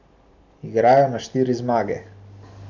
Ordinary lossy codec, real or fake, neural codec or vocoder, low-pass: none; real; none; 7.2 kHz